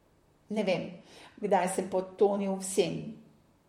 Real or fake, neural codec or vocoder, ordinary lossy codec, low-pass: fake; vocoder, 44.1 kHz, 128 mel bands, Pupu-Vocoder; MP3, 64 kbps; 19.8 kHz